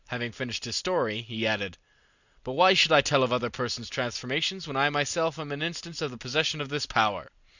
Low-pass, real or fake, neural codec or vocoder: 7.2 kHz; real; none